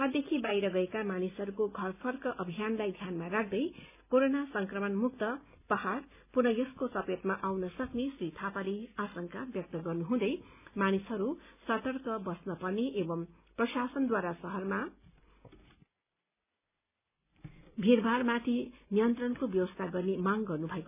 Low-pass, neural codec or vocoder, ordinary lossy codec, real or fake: 3.6 kHz; none; none; real